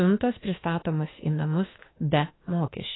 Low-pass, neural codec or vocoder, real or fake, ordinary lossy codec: 7.2 kHz; autoencoder, 48 kHz, 32 numbers a frame, DAC-VAE, trained on Japanese speech; fake; AAC, 16 kbps